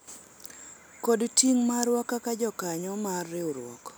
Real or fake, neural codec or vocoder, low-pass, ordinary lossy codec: real; none; none; none